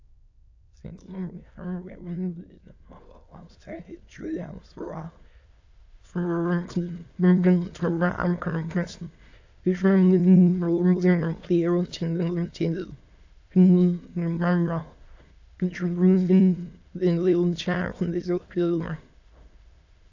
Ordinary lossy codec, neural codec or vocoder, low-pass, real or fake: AAC, 48 kbps; autoencoder, 22.05 kHz, a latent of 192 numbers a frame, VITS, trained on many speakers; 7.2 kHz; fake